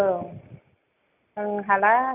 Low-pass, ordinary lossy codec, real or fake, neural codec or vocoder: 3.6 kHz; none; real; none